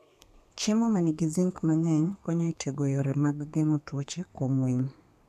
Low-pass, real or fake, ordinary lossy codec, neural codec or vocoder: 14.4 kHz; fake; none; codec, 32 kHz, 1.9 kbps, SNAC